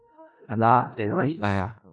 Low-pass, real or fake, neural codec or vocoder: 10.8 kHz; fake; codec, 16 kHz in and 24 kHz out, 0.4 kbps, LongCat-Audio-Codec, four codebook decoder